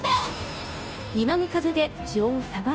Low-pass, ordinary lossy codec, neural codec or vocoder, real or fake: none; none; codec, 16 kHz, 0.5 kbps, FunCodec, trained on Chinese and English, 25 frames a second; fake